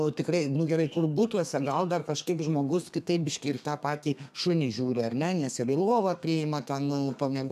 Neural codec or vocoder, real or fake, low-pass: codec, 32 kHz, 1.9 kbps, SNAC; fake; 14.4 kHz